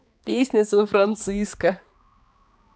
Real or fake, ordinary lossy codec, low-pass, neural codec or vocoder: fake; none; none; codec, 16 kHz, 2 kbps, X-Codec, HuBERT features, trained on balanced general audio